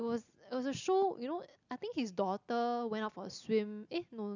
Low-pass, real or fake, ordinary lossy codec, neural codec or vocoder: 7.2 kHz; real; none; none